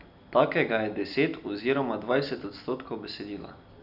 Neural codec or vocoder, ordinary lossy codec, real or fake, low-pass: none; none; real; 5.4 kHz